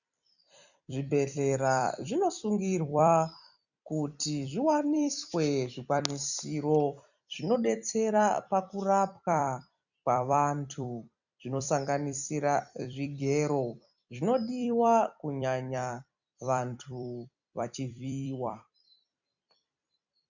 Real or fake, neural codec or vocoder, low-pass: fake; vocoder, 44.1 kHz, 128 mel bands every 256 samples, BigVGAN v2; 7.2 kHz